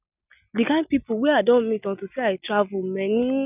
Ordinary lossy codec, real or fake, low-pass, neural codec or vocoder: none; real; 3.6 kHz; none